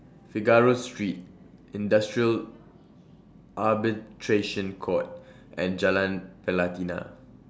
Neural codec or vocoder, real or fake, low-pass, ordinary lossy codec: none; real; none; none